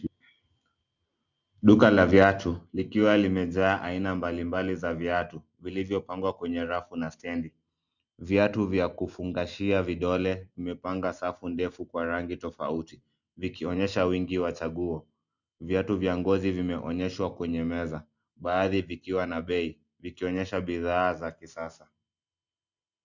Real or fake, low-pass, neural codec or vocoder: real; 7.2 kHz; none